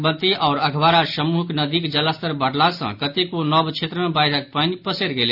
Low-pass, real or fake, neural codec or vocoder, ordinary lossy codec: 5.4 kHz; real; none; none